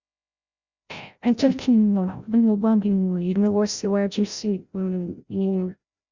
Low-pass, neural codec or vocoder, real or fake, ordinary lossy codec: 7.2 kHz; codec, 16 kHz, 0.5 kbps, FreqCodec, larger model; fake; Opus, 64 kbps